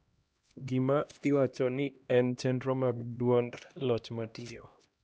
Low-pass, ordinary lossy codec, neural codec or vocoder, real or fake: none; none; codec, 16 kHz, 1 kbps, X-Codec, HuBERT features, trained on LibriSpeech; fake